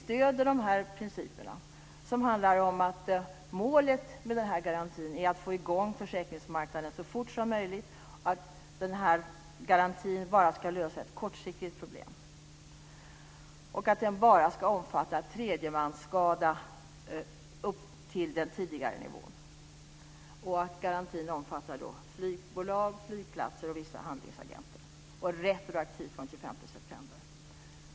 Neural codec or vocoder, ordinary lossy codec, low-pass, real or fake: none; none; none; real